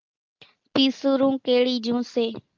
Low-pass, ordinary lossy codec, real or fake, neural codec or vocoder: 7.2 kHz; Opus, 32 kbps; real; none